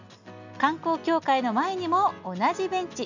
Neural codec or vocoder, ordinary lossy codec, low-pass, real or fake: none; none; 7.2 kHz; real